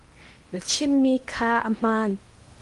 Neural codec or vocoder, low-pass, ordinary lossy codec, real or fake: codec, 16 kHz in and 24 kHz out, 0.8 kbps, FocalCodec, streaming, 65536 codes; 10.8 kHz; Opus, 24 kbps; fake